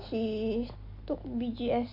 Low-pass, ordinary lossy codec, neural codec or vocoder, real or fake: 5.4 kHz; MP3, 32 kbps; none; real